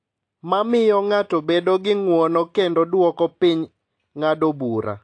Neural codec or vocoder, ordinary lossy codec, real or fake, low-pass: none; AAC, 48 kbps; real; 9.9 kHz